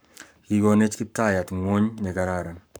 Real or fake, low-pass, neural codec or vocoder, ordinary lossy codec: fake; none; codec, 44.1 kHz, 7.8 kbps, Pupu-Codec; none